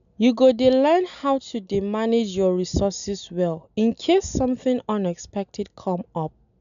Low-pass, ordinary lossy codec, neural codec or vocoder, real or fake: 7.2 kHz; none; none; real